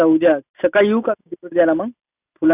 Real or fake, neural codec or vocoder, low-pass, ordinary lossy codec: real; none; 3.6 kHz; none